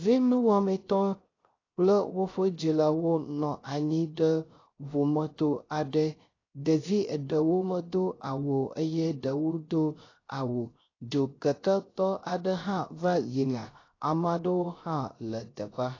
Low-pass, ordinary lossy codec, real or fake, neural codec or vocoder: 7.2 kHz; AAC, 32 kbps; fake; codec, 16 kHz, 0.3 kbps, FocalCodec